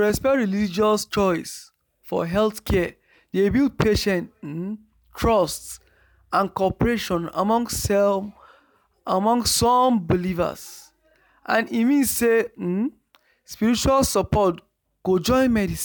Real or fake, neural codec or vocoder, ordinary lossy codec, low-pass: real; none; none; none